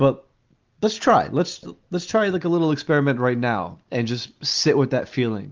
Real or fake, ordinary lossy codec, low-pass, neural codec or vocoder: real; Opus, 32 kbps; 7.2 kHz; none